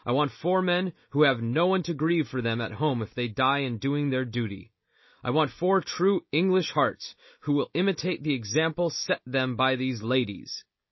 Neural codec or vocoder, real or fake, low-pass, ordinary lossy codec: none; real; 7.2 kHz; MP3, 24 kbps